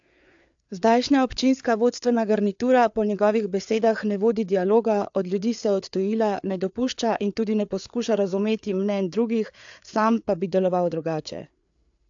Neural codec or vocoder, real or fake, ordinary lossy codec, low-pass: codec, 16 kHz, 4 kbps, FreqCodec, larger model; fake; AAC, 64 kbps; 7.2 kHz